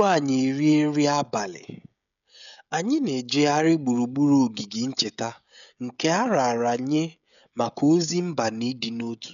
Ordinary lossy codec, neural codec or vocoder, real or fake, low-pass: none; codec, 16 kHz, 16 kbps, FreqCodec, smaller model; fake; 7.2 kHz